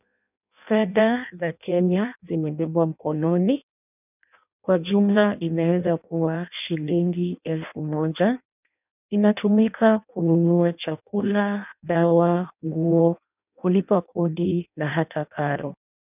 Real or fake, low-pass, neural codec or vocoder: fake; 3.6 kHz; codec, 16 kHz in and 24 kHz out, 0.6 kbps, FireRedTTS-2 codec